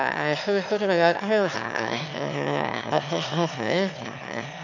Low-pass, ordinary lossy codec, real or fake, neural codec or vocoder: 7.2 kHz; none; fake; autoencoder, 22.05 kHz, a latent of 192 numbers a frame, VITS, trained on one speaker